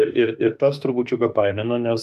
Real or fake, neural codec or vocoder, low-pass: fake; autoencoder, 48 kHz, 32 numbers a frame, DAC-VAE, trained on Japanese speech; 14.4 kHz